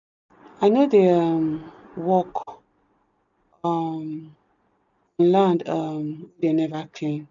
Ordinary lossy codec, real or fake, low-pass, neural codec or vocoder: none; real; 7.2 kHz; none